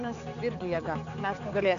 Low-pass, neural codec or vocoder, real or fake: 7.2 kHz; codec, 16 kHz, 4 kbps, X-Codec, HuBERT features, trained on balanced general audio; fake